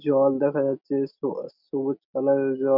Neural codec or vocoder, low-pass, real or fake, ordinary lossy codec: none; 5.4 kHz; real; none